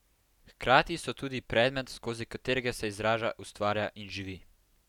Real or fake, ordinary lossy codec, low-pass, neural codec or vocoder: real; none; 19.8 kHz; none